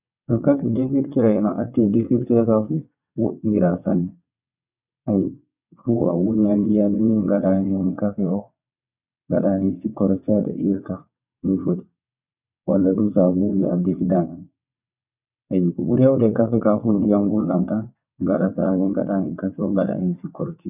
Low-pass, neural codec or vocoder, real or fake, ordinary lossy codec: 3.6 kHz; vocoder, 22.05 kHz, 80 mel bands, WaveNeXt; fake; none